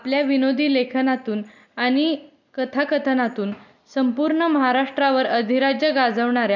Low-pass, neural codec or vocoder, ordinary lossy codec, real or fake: 7.2 kHz; none; none; real